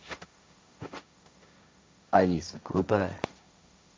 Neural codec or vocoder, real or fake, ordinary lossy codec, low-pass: codec, 16 kHz, 1.1 kbps, Voila-Tokenizer; fake; none; none